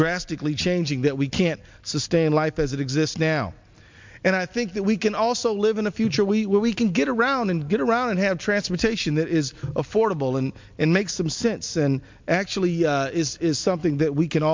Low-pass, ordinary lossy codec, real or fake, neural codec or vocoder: 7.2 kHz; MP3, 64 kbps; real; none